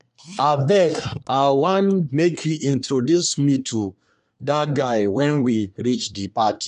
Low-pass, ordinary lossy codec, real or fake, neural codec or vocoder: 10.8 kHz; none; fake; codec, 24 kHz, 1 kbps, SNAC